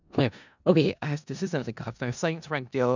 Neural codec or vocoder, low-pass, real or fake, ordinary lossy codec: codec, 16 kHz in and 24 kHz out, 0.4 kbps, LongCat-Audio-Codec, four codebook decoder; 7.2 kHz; fake; none